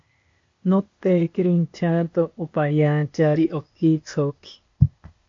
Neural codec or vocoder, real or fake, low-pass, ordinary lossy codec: codec, 16 kHz, 0.8 kbps, ZipCodec; fake; 7.2 kHz; MP3, 48 kbps